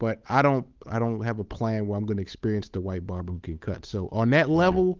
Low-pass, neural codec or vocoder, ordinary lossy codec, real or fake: 7.2 kHz; codec, 16 kHz, 8 kbps, FunCodec, trained on Chinese and English, 25 frames a second; Opus, 32 kbps; fake